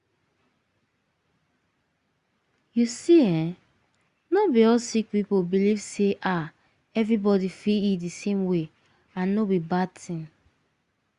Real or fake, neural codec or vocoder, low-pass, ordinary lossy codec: real; none; 10.8 kHz; Opus, 64 kbps